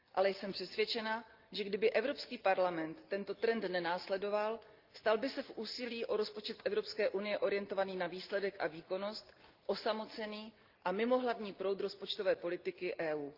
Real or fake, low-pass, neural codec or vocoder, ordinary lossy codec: real; 5.4 kHz; none; Opus, 32 kbps